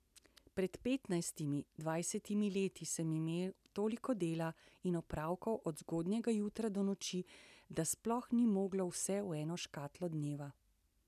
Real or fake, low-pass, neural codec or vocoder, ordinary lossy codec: real; 14.4 kHz; none; none